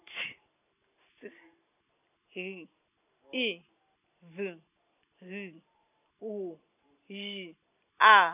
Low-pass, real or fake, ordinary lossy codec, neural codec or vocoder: 3.6 kHz; real; none; none